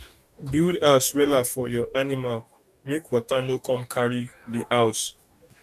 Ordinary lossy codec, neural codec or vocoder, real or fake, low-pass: none; codec, 44.1 kHz, 2.6 kbps, DAC; fake; 14.4 kHz